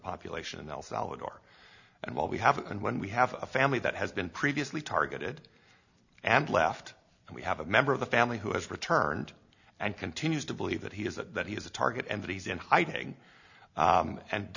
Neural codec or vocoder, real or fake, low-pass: none; real; 7.2 kHz